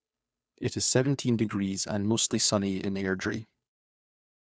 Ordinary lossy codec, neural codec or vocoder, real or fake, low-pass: none; codec, 16 kHz, 2 kbps, FunCodec, trained on Chinese and English, 25 frames a second; fake; none